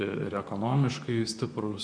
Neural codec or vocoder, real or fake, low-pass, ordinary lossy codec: vocoder, 44.1 kHz, 128 mel bands, Pupu-Vocoder; fake; 9.9 kHz; MP3, 96 kbps